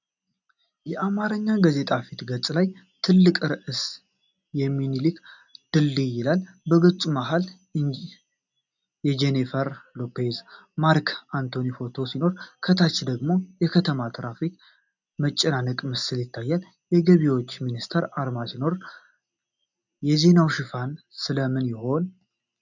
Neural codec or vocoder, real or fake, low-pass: none; real; 7.2 kHz